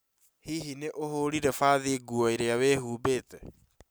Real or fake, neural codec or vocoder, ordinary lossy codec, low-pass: real; none; none; none